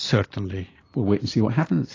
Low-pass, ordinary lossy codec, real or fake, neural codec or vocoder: 7.2 kHz; AAC, 32 kbps; real; none